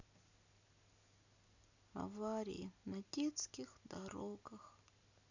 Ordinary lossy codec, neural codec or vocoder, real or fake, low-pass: none; none; real; 7.2 kHz